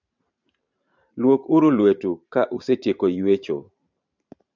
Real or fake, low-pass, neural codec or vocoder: fake; 7.2 kHz; vocoder, 44.1 kHz, 128 mel bands every 512 samples, BigVGAN v2